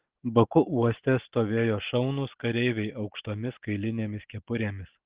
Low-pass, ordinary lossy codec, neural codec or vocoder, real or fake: 3.6 kHz; Opus, 16 kbps; none; real